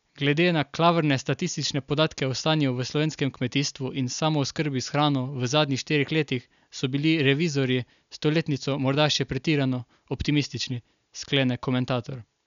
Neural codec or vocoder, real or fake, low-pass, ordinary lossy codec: none; real; 7.2 kHz; none